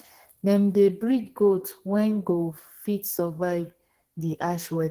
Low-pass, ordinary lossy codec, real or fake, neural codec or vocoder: 14.4 kHz; Opus, 16 kbps; fake; codec, 32 kHz, 1.9 kbps, SNAC